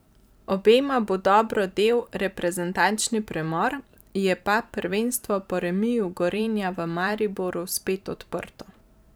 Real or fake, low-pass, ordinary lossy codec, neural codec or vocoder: fake; none; none; vocoder, 44.1 kHz, 128 mel bands every 512 samples, BigVGAN v2